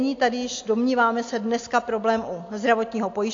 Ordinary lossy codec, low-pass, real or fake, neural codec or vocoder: AAC, 48 kbps; 7.2 kHz; real; none